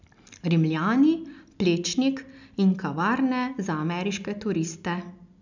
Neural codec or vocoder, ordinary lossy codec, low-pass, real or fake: none; none; 7.2 kHz; real